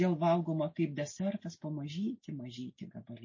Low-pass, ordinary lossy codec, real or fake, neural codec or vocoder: 7.2 kHz; MP3, 32 kbps; real; none